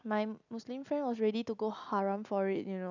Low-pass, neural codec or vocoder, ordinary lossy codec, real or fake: 7.2 kHz; none; none; real